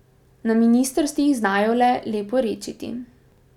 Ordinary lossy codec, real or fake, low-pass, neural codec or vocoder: none; real; 19.8 kHz; none